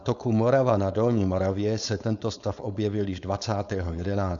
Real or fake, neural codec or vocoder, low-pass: fake; codec, 16 kHz, 4.8 kbps, FACodec; 7.2 kHz